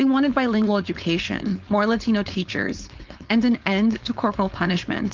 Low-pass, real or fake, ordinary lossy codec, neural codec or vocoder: 7.2 kHz; fake; Opus, 32 kbps; codec, 16 kHz, 4.8 kbps, FACodec